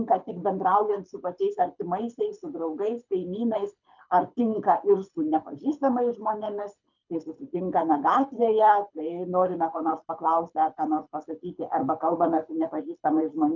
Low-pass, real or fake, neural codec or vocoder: 7.2 kHz; fake; codec, 24 kHz, 6 kbps, HILCodec